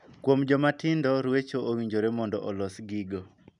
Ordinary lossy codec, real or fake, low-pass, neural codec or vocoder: none; real; 10.8 kHz; none